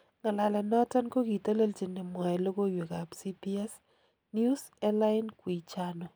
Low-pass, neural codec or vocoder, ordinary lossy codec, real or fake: none; none; none; real